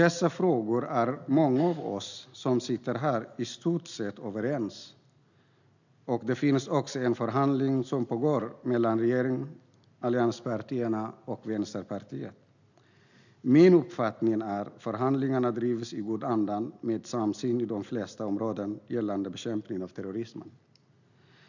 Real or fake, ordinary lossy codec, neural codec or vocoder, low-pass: real; none; none; 7.2 kHz